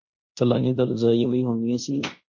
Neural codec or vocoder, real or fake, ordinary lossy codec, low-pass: codec, 16 kHz in and 24 kHz out, 0.9 kbps, LongCat-Audio-Codec, fine tuned four codebook decoder; fake; MP3, 48 kbps; 7.2 kHz